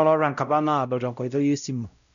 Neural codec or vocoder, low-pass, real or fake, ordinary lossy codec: codec, 16 kHz, 0.5 kbps, X-Codec, WavLM features, trained on Multilingual LibriSpeech; 7.2 kHz; fake; none